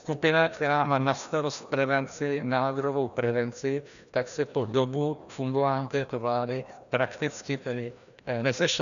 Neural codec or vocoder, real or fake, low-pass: codec, 16 kHz, 1 kbps, FreqCodec, larger model; fake; 7.2 kHz